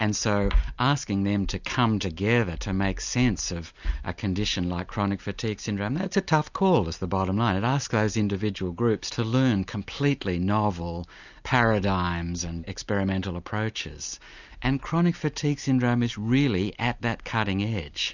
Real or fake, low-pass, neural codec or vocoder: real; 7.2 kHz; none